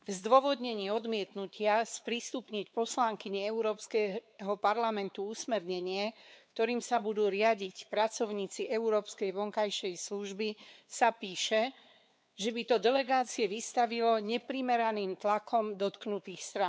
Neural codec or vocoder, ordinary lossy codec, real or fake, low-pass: codec, 16 kHz, 4 kbps, X-Codec, WavLM features, trained on Multilingual LibriSpeech; none; fake; none